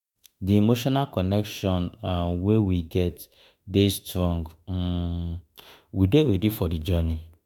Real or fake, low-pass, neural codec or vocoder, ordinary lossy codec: fake; 19.8 kHz; autoencoder, 48 kHz, 32 numbers a frame, DAC-VAE, trained on Japanese speech; none